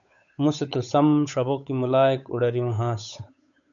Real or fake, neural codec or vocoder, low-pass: fake; codec, 16 kHz, 8 kbps, FunCodec, trained on Chinese and English, 25 frames a second; 7.2 kHz